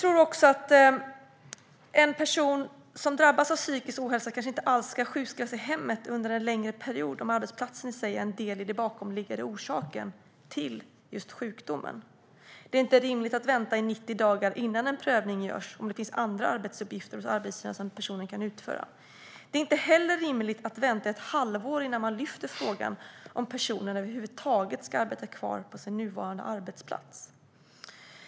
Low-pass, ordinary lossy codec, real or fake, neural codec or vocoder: none; none; real; none